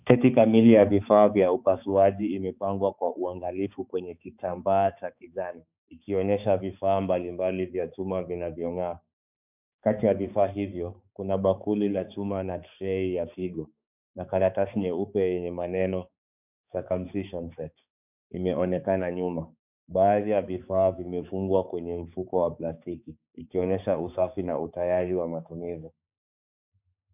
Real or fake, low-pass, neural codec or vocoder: fake; 3.6 kHz; codec, 16 kHz, 4 kbps, X-Codec, HuBERT features, trained on balanced general audio